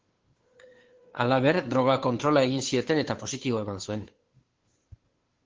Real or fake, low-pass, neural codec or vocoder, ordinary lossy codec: fake; 7.2 kHz; codec, 16 kHz, 2 kbps, FunCodec, trained on Chinese and English, 25 frames a second; Opus, 16 kbps